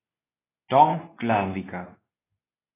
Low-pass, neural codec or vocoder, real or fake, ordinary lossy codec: 3.6 kHz; codec, 24 kHz, 0.9 kbps, WavTokenizer, medium speech release version 2; fake; AAC, 16 kbps